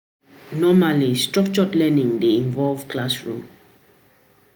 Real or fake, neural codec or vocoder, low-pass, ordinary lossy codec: real; none; none; none